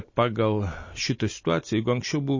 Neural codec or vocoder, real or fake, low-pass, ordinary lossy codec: none; real; 7.2 kHz; MP3, 32 kbps